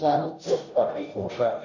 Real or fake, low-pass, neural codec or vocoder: fake; 7.2 kHz; codec, 16 kHz, 0.5 kbps, FunCodec, trained on Chinese and English, 25 frames a second